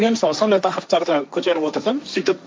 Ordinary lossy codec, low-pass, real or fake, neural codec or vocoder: none; none; fake; codec, 16 kHz, 1.1 kbps, Voila-Tokenizer